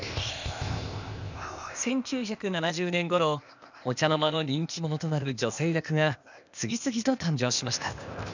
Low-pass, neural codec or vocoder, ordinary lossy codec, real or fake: 7.2 kHz; codec, 16 kHz, 0.8 kbps, ZipCodec; none; fake